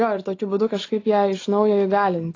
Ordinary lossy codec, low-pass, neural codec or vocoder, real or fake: AAC, 32 kbps; 7.2 kHz; none; real